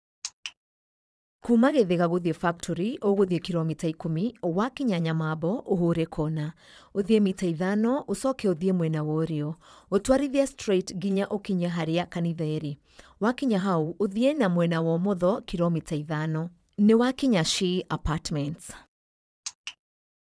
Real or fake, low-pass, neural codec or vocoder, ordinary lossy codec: fake; none; vocoder, 22.05 kHz, 80 mel bands, Vocos; none